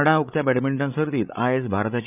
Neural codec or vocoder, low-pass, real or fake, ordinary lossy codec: codec, 16 kHz, 16 kbps, FreqCodec, larger model; 3.6 kHz; fake; none